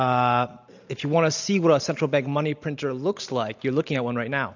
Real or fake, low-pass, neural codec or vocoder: real; 7.2 kHz; none